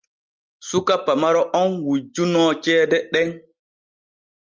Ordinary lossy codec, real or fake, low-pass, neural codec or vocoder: Opus, 24 kbps; real; 7.2 kHz; none